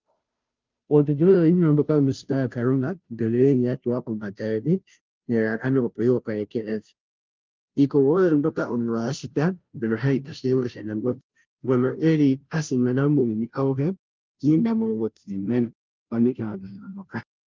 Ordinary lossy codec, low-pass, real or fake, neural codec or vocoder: Opus, 24 kbps; 7.2 kHz; fake; codec, 16 kHz, 0.5 kbps, FunCodec, trained on Chinese and English, 25 frames a second